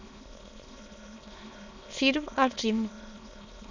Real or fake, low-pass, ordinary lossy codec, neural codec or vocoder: fake; 7.2 kHz; MP3, 64 kbps; autoencoder, 22.05 kHz, a latent of 192 numbers a frame, VITS, trained on many speakers